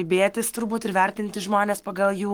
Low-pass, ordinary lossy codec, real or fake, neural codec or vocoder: 19.8 kHz; Opus, 24 kbps; fake; codec, 44.1 kHz, 7.8 kbps, Pupu-Codec